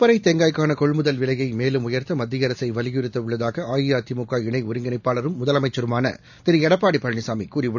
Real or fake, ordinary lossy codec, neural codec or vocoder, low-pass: real; none; none; 7.2 kHz